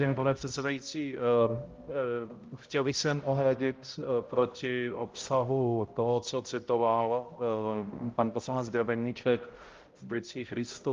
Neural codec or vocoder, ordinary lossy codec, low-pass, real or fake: codec, 16 kHz, 0.5 kbps, X-Codec, HuBERT features, trained on balanced general audio; Opus, 32 kbps; 7.2 kHz; fake